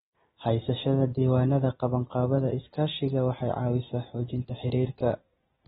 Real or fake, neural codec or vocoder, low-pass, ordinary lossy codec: real; none; 19.8 kHz; AAC, 16 kbps